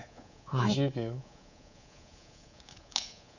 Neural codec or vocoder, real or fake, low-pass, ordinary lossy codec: codec, 24 kHz, 3.1 kbps, DualCodec; fake; 7.2 kHz; none